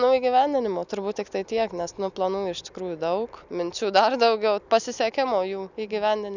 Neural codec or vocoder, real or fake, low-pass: none; real; 7.2 kHz